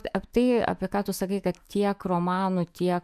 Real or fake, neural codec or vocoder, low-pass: fake; autoencoder, 48 kHz, 128 numbers a frame, DAC-VAE, trained on Japanese speech; 14.4 kHz